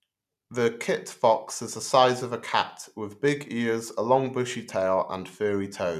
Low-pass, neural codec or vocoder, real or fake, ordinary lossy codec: 14.4 kHz; none; real; none